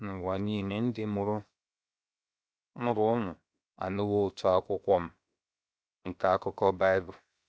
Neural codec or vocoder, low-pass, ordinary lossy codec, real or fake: codec, 16 kHz, 0.7 kbps, FocalCodec; none; none; fake